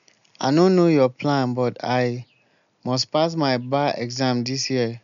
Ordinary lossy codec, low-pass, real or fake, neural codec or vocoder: none; 7.2 kHz; real; none